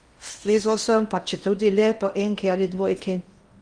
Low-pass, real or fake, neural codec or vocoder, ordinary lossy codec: 9.9 kHz; fake; codec, 16 kHz in and 24 kHz out, 0.8 kbps, FocalCodec, streaming, 65536 codes; Opus, 32 kbps